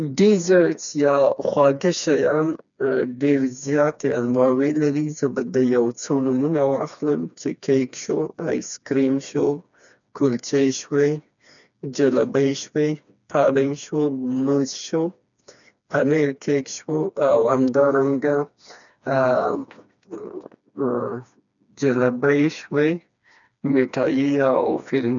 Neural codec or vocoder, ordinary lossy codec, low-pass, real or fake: codec, 16 kHz, 2 kbps, FreqCodec, smaller model; none; 7.2 kHz; fake